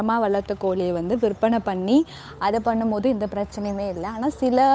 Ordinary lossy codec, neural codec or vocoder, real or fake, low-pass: none; codec, 16 kHz, 8 kbps, FunCodec, trained on Chinese and English, 25 frames a second; fake; none